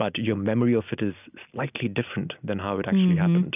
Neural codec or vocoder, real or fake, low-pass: none; real; 3.6 kHz